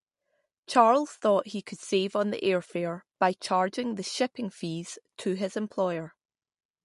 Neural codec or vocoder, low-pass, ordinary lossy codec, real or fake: none; 14.4 kHz; MP3, 48 kbps; real